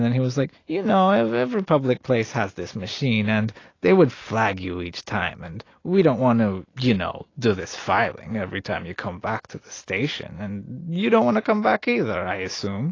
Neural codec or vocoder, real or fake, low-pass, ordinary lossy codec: none; real; 7.2 kHz; AAC, 32 kbps